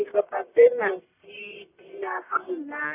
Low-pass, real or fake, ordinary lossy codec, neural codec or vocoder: 3.6 kHz; fake; none; codec, 44.1 kHz, 1.7 kbps, Pupu-Codec